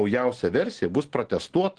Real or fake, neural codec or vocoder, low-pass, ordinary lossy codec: real; none; 9.9 kHz; Opus, 16 kbps